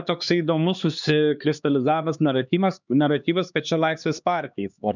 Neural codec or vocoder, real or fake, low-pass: codec, 16 kHz, 2 kbps, X-Codec, HuBERT features, trained on LibriSpeech; fake; 7.2 kHz